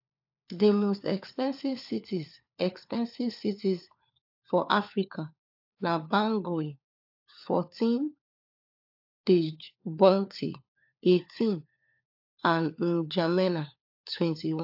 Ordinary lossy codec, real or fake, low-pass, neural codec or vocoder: AAC, 48 kbps; fake; 5.4 kHz; codec, 16 kHz, 4 kbps, FunCodec, trained on LibriTTS, 50 frames a second